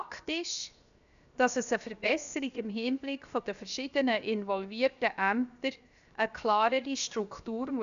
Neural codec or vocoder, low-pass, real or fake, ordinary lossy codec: codec, 16 kHz, 0.7 kbps, FocalCodec; 7.2 kHz; fake; MP3, 96 kbps